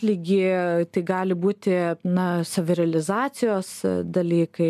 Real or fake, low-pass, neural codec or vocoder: real; 14.4 kHz; none